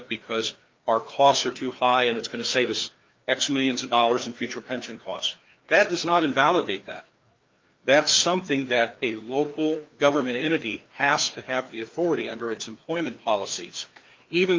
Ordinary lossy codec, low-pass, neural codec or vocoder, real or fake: Opus, 32 kbps; 7.2 kHz; codec, 16 kHz, 2 kbps, FreqCodec, larger model; fake